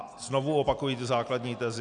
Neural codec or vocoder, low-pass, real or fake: none; 9.9 kHz; real